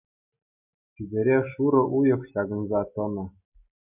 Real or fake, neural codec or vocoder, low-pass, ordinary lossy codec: real; none; 3.6 kHz; MP3, 32 kbps